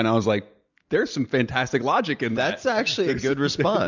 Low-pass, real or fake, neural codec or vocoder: 7.2 kHz; real; none